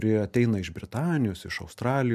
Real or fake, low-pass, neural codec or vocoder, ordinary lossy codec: real; 14.4 kHz; none; MP3, 96 kbps